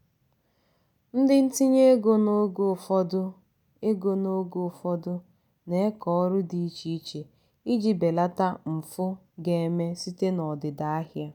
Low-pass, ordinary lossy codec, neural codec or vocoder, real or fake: 19.8 kHz; none; none; real